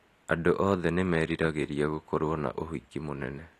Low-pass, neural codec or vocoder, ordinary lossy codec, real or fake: 14.4 kHz; none; AAC, 48 kbps; real